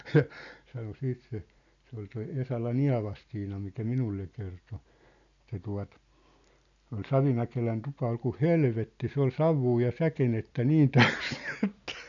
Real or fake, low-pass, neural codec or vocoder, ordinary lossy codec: real; 7.2 kHz; none; none